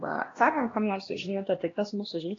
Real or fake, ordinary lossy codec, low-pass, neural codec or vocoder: fake; AAC, 32 kbps; 7.2 kHz; codec, 16 kHz, 1 kbps, X-Codec, HuBERT features, trained on LibriSpeech